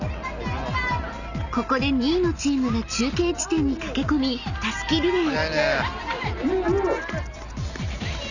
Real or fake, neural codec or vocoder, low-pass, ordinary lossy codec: real; none; 7.2 kHz; none